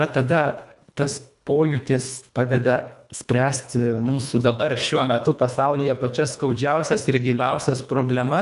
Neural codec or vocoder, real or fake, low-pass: codec, 24 kHz, 1.5 kbps, HILCodec; fake; 10.8 kHz